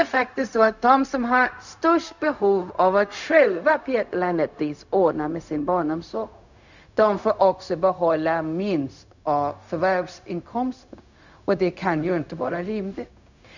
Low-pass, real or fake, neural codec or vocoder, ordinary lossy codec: 7.2 kHz; fake; codec, 16 kHz, 0.4 kbps, LongCat-Audio-Codec; none